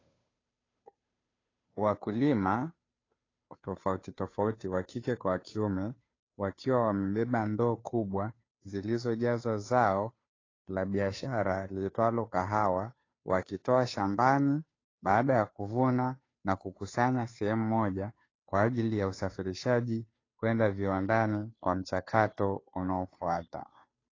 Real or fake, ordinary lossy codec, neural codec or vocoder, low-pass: fake; AAC, 32 kbps; codec, 16 kHz, 2 kbps, FunCodec, trained on Chinese and English, 25 frames a second; 7.2 kHz